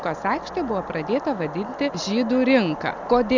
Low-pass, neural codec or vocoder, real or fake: 7.2 kHz; none; real